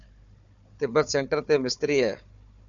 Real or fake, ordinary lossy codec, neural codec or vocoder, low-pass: fake; MP3, 96 kbps; codec, 16 kHz, 4 kbps, FunCodec, trained on Chinese and English, 50 frames a second; 7.2 kHz